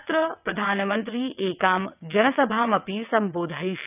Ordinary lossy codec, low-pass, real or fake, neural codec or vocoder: none; 3.6 kHz; fake; vocoder, 22.05 kHz, 80 mel bands, WaveNeXt